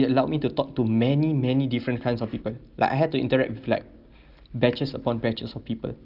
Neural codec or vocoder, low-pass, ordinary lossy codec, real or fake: none; 5.4 kHz; Opus, 32 kbps; real